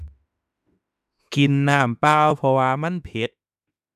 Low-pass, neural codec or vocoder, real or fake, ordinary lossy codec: 14.4 kHz; autoencoder, 48 kHz, 32 numbers a frame, DAC-VAE, trained on Japanese speech; fake; none